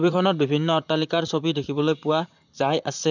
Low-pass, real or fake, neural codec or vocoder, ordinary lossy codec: 7.2 kHz; fake; vocoder, 44.1 kHz, 128 mel bands, Pupu-Vocoder; none